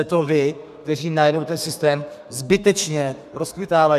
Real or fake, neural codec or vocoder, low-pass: fake; codec, 32 kHz, 1.9 kbps, SNAC; 14.4 kHz